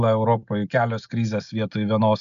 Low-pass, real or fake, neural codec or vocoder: 7.2 kHz; real; none